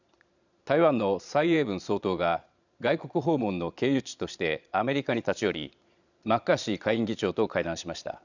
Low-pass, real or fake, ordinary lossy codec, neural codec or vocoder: 7.2 kHz; fake; none; vocoder, 22.05 kHz, 80 mel bands, Vocos